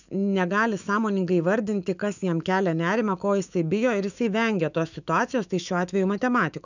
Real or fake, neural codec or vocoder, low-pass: fake; autoencoder, 48 kHz, 128 numbers a frame, DAC-VAE, trained on Japanese speech; 7.2 kHz